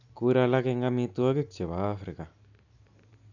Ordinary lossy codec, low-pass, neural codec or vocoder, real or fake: none; 7.2 kHz; none; real